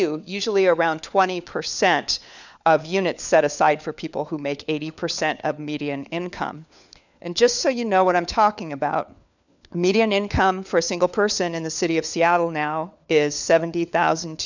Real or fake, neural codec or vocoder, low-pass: fake; codec, 16 kHz, 4 kbps, FunCodec, trained on LibriTTS, 50 frames a second; 7.2 kHz